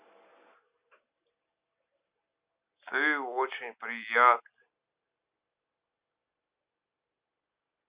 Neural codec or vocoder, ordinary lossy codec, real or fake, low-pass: none; Opus, 64 kbps; real; 3.6 kHz